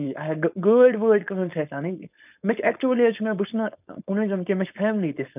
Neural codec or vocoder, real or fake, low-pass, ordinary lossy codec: codec, 16 kHz, 4.8 kbps, FACodec; fake; 3.6 kHz; none